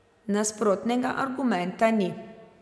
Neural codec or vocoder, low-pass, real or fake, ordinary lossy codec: none; none; real; none